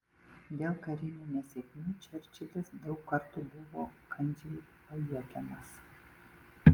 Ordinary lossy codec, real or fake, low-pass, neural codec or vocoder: Opus, 32 kbps; real; 19.8 kHz; none